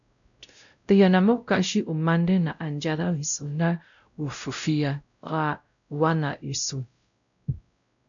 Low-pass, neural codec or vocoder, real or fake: 7.2 kHz; codec, 16 kHz, 0.5 kbps, X-Codec, WavLM features, trained on Multilingual LibriSpeech; fake